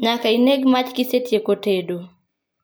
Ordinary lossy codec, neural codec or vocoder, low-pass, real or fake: none; none; none; real